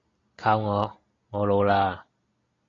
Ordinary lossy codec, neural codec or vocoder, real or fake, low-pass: AAC, 32 kbps; none; real; 7.2 kHz